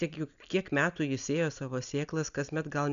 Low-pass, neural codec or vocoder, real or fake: 7.2 kHz; none; real